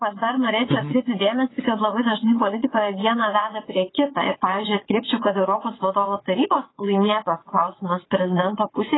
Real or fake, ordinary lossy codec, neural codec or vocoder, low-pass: fake; AAC, 16 kbps; vocoder, 22.05 kHz, 80 mel bands, WaveNeXt; 7.2 kHz